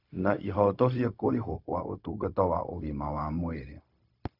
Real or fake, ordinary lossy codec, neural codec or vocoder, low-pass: fake; none; codec, 16 kHz, 0.4 kbps, LongCat-Audio-Codec; 5.4 kHz